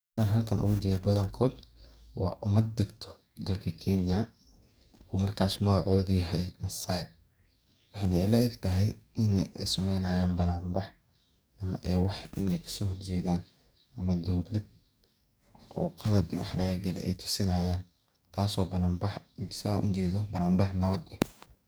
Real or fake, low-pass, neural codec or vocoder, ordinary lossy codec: fake; none; codec, 44.1 kHz, 2.6 kbps, DAC; none